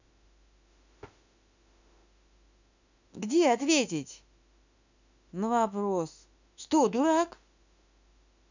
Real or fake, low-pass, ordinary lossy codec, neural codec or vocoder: fake; 7.2 kHz; none; autoencoder, 48 kHz, 32 numbers a frame, DAC-VAE, trained on Japanese speech